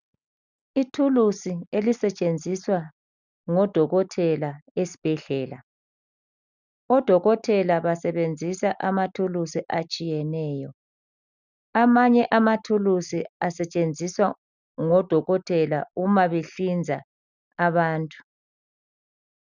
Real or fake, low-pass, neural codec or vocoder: real; 7.2 kHz; none